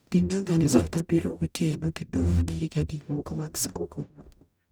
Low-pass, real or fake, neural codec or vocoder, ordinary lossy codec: none; fake; codec, 44.1 kHz, 0.9 kbps, DAC; none